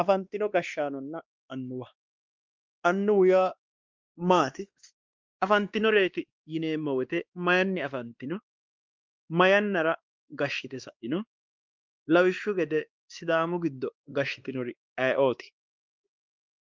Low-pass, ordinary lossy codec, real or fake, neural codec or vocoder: 7.2 kHz; Opus, 32 kbps; fake; codec, 16 kHz, 2 kbps, X-Codec, WavLM features, trained on Multilingual LibriSpeech